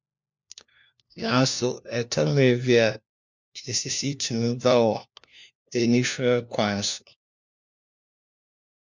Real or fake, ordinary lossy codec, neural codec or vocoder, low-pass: fake; MP3, 64 kbps; codec, 16 kHz, 1 kbps, FunCodec, trained on LibriTTS, 50 frames a second; 7.2 kHz